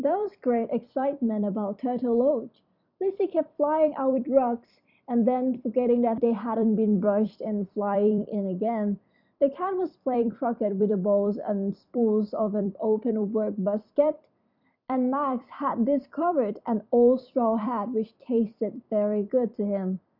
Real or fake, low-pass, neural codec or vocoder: fake; 5.4 kHz; vocoder, 44.1 kHz, 128 mel bands every 256 samples, BigVGAN v2